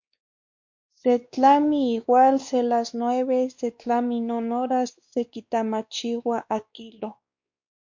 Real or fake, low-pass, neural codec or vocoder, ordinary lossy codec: fake; 7.2 kHz; codec, 16 kHz, 2 kbps, X-Codec, WavLM features, trained on Multilingual LibriSpeech; MP3, 48 kbps